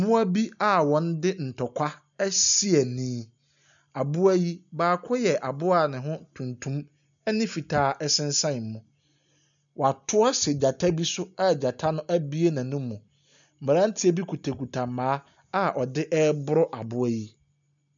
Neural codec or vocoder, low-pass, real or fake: none; 7.2 kHz; real